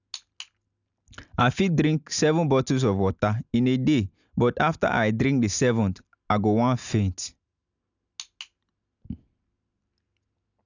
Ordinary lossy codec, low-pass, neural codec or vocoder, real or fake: none; 7.2 kHz; none; real